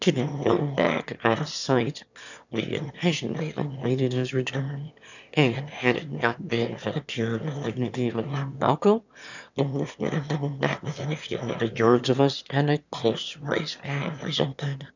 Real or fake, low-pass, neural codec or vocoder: fake; 7.2 kHz; autoencoder, 22.05 kHz, a latent of 192 numbers a frame, VITS, trained on one speaker